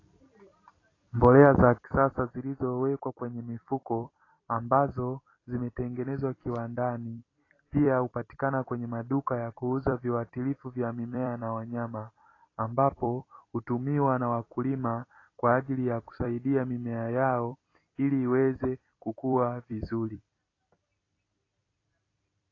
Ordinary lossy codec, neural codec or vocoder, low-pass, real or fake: AAC, 32 kbps; vocoder, 44.1 kHz, 128 mel bands every 256 samples, BigVGAN v2; 7.2 kHz; fake